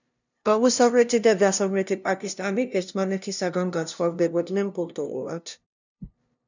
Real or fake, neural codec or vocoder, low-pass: fake; codec, 16 kHz, 0.5 kbps, FunCodec, trained on LibriTTS, 25 frames a second; 7.2 kHz